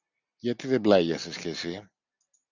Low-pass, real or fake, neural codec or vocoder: 7.2 kHz; real; none